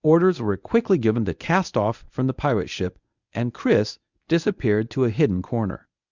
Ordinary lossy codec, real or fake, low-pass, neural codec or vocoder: Opus, 64 kbps; fake; 7.2 kHz; codec, 24 kHz, 0.9 kbps, WavTokenizer, medium speech release version 1